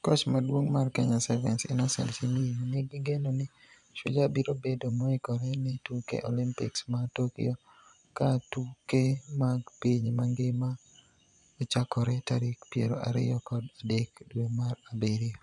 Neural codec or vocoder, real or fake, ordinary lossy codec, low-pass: vocoder, 24 kHz, 100 mel bands, Vocos; fake; none; 10.8 kHz